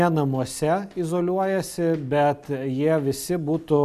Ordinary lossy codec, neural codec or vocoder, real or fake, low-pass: MP3, 96 kbps; none; real; 14.4 kHz